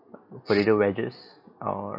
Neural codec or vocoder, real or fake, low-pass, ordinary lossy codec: none; real; 5.4 kHz; none